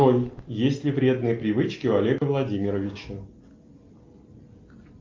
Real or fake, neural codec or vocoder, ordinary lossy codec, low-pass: real; none; Opus, 24 kbps; 7.2 kHz